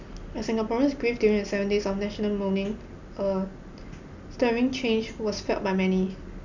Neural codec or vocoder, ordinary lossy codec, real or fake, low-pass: none; none; real; 7.2 kHz